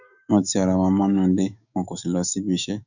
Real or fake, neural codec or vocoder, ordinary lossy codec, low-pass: fake; autoencoder, 48 kHz, 128 numbers a frame, DAC-VAE, trained on Japanese speech; none; 7.2 kHz